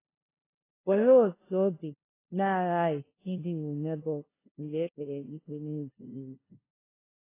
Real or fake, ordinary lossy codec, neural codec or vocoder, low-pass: fake; AAC, 16 kbps; codec, 16 kHz, 0.5 kbps, FunCodec, trained on LibriTTS, 25 frames a second; 3.6 kHz